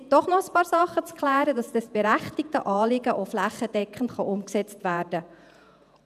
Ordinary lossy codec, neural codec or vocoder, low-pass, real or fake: none; vocoder, 48 kHz, 128 mel bands, Vocos; 14.4 kHz; fake